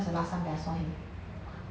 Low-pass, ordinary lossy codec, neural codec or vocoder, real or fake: none; none; none; real